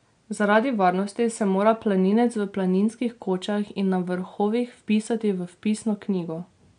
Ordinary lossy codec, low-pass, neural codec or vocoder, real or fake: MP3, 64 kbps; 9.9 kHz; none; real